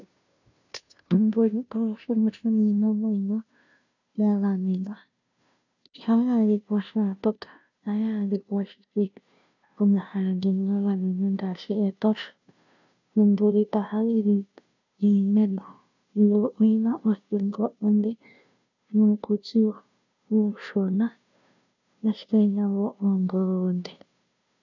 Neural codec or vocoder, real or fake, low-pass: codec, 16 kHz, 0.5 kbps, FunCodec, trained on Chinese and English, 25 frames a second; fake; 7.2 kHz